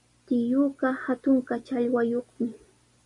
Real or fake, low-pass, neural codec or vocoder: real; 10.8 kHz; none